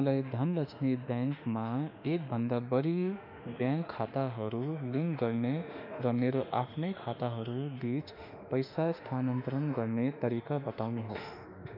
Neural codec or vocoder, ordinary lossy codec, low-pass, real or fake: autoencoder, 48 kHz, 32 numbers a frame, DAC-VAE, trained on Japanese speech; none; 5.4 kHz; fake